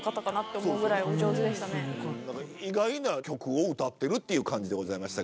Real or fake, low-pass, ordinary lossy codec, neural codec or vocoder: real; none; none; none